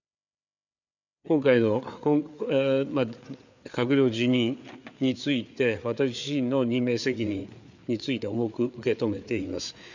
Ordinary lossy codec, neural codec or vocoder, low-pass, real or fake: none; codec, 16 kHz, 4 kbps, FreqCodec, larger model; 7.2 kHz; fake